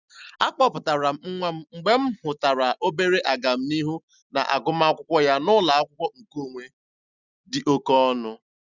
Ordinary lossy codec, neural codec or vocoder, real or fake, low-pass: none; none; real; 7.2 kHz